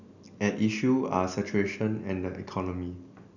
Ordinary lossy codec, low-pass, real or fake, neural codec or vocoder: none; 7.2 kHz; real; none